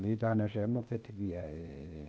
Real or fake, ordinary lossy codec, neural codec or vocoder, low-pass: fake; none; codec, 16 kHz, 0.8 kbps, ZipCodec; none